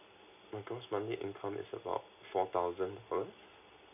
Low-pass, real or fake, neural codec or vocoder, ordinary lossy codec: 3.6 kHz; real; none; none